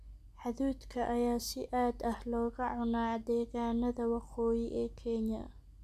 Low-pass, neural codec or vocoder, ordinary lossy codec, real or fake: 14.4 kHz; none; none; real